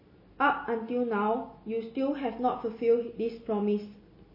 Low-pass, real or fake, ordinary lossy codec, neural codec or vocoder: 5.4 kHz; real; MP3, 24 kbps; none